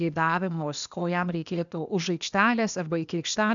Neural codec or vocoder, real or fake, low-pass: codec, 16 kHz, 0.8 kbps, ZipCodec; fake; 7.2 kHz